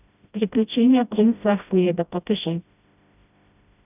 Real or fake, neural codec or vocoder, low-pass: fake; codec, 16 kHz, 0.5 kbps, FreqCodec, smaller model; 3.6 kHz